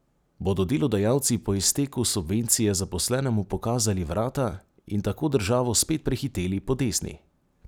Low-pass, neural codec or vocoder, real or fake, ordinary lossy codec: none; none; real; none